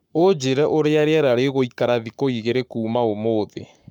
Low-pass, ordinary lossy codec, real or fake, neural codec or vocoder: 19.8 kHz; none; fake; codec, 44.1 kHz, 7.8 kbps, Pupu-Codec